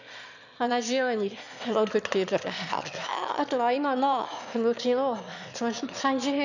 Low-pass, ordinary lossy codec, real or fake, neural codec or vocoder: 7.2 kHz; none; fake; autoencoder, 22.05 kHz, a latent of 192 numbers a frame, VITS, trained on one speaker